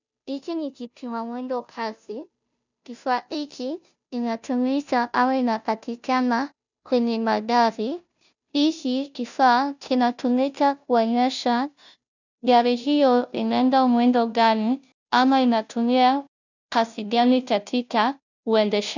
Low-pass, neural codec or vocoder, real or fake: 7.2 kHz; codec, 16 kHz, 0.5 kbps, FunCodec, trained on Chinese and English, 25 frames a second; fake